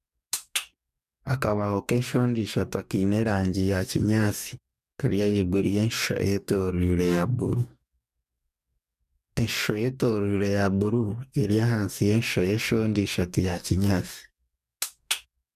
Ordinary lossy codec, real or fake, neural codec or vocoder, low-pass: none; fake; codec, 44.1 kHz, 2.6 kbps, DAC; 14.4 kHz